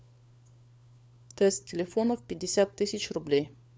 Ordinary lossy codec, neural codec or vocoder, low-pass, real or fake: none; codec, 16 kHz, 4 kbps, FunCodec, trained on LibriTTS, 50 frames a second; none; fake